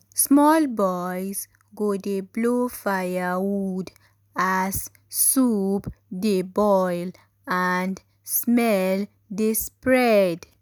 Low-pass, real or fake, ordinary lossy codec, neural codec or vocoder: none; real; none; none